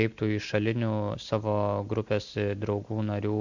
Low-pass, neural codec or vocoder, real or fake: 7.2 kHz; none; real